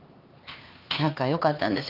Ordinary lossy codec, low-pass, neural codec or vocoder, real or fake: Opus, 32 kbps; 5.4 kHz; codec, 16 kHz, 4 kbps, X-Codec, HuBERT features, trained on LibriSpeech; fake